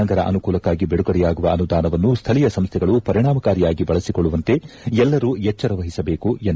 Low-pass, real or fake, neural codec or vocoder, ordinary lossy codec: none; real; none; none